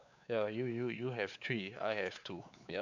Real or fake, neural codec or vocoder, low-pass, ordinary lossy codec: fake; codec, 16 kHz, 4 kbps, X-Codec, WavLM features, trained on Multilingual LibriSpeech; 7.2 kHz; none